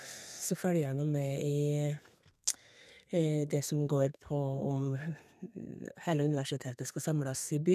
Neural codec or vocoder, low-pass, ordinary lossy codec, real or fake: codec, 32 kHz, 1.9 kbps, SNAC; 14.4 kHz; none; fake